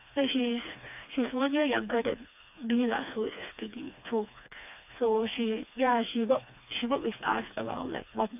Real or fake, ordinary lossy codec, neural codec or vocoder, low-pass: fake; none; codec, 16 kHz, 2 kbps, FreqCodec, smaller model; 3.6 kHz